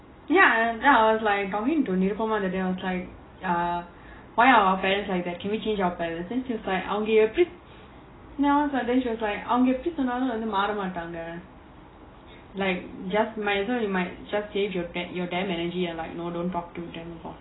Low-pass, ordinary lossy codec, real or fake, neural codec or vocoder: 7.2 kHz; AAC, 16 kbps; real; none